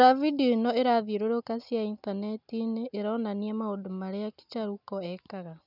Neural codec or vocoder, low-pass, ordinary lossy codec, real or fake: none; 5.4 kHz; none; real